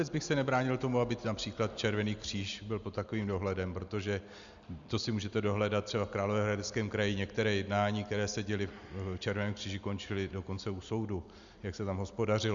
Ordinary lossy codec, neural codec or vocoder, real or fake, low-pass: Opus, 64 kbps; none; real; 7.2 kHz